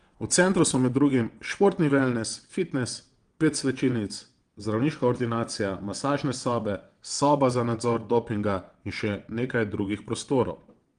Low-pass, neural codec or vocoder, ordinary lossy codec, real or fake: 9.9 kHz; vocoder, 22.05 kHz, 80 mel bands, WaveNeXt; Opus, 24 kbps; fake